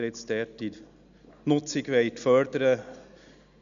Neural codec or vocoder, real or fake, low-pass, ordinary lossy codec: none; real; 7.2 kHz; none